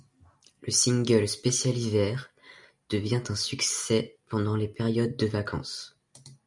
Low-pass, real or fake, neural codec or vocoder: 10.8 kHz; real; none